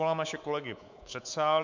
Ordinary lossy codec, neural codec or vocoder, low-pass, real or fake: MP3, 64 kbps; codec, 24 kHz, 3.1 kbps, DualCodec; 7.2 kHz; fake